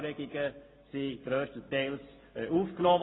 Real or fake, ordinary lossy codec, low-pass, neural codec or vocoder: real; AAC, 16 kbps; 7.2 kHz; none